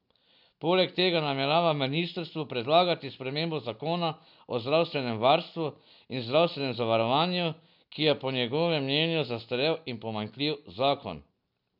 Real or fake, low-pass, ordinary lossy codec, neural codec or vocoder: real; 5.4 kHz; none; none